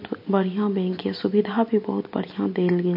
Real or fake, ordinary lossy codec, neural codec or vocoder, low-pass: real; MP3, 32 kbps; none; 5.4 kHz